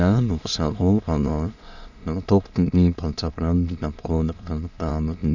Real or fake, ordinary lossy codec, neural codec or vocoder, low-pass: fake; none; autoencoder, 22.05 kHz, a latent of 192 numbers a frame, VITS, trained on many speakers; 7.2 kHz